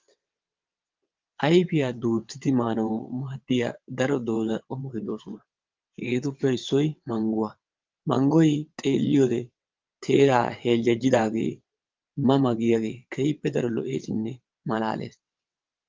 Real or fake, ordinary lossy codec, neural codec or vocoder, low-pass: fake; Opus, 24 kbps; vocoder, 22.05 kHz, 80 mel bands, WaveNeXt; 7.2 kHz